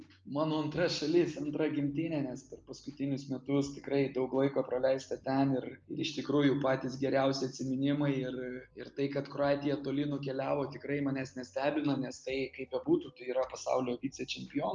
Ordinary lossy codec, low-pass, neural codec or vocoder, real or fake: Opus, 24 kbps; 7.2 kHz; none; real